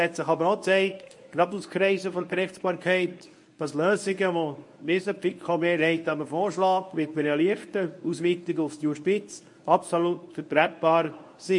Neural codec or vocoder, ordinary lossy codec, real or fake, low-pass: codec, 24 kHz, 0.9 kbps, WavTokenizer, medium speech release version 1; MP3, 48 kbps; fake; 10.8 kHz